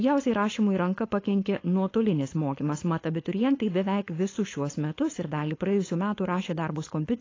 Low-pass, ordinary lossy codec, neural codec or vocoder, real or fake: 7.2 kHz; AAC, 32 kbps; codec, 16 kHz, 4.8 kbps, FACodec; fake